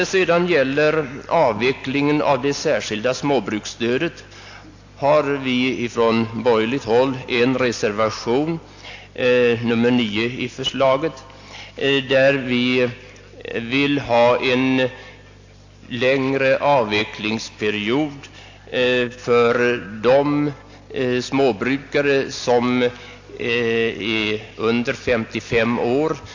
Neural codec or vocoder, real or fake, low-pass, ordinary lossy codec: none; real; 7.2 kHz; AAC, 48 kbps